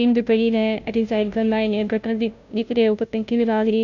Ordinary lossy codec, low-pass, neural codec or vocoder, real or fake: none; 7.2 kHz; codec, 16 kHz, 0.5 kbps, FunCodec, trained on Chinese and English, 25 frames a second; fake